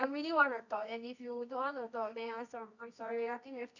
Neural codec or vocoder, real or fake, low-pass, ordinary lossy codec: codec, 24 kHz, 0.9 kbps, WavTokenizer, medium music audio release; fake; 7.2 kHz; none